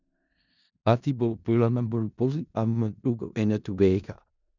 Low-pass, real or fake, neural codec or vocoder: 7.2 kHz; fake; codec, 16 kHz in and 24 kHz out, 0.4 kbps, LongCat-Audio-Codec, four codebook decoder